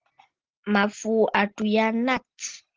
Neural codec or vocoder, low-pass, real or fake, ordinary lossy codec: none; 7.2 kHz; real; Opus, 16 kbps